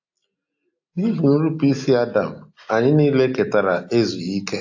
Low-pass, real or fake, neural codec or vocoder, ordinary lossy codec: 7.2 kHz; real; none; AAC, 48 kbps